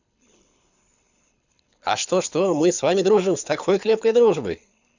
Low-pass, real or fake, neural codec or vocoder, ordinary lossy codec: 7.2 kHz; fake; codec, 24 kHz, 6 kbps, HILCodec; none